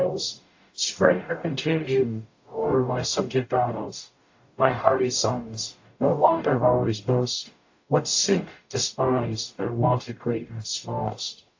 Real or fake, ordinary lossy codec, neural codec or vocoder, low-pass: fake; AAC, 48 kbps; codec, 44.1 kHz, 0.9 kbps, DAC; 7.2 kHz